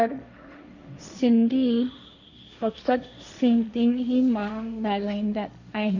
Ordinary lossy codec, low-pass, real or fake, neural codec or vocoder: none; 7.2 kHz; fake; codec, 16 kHz, 1.1 kbps, Voila-Tokenizer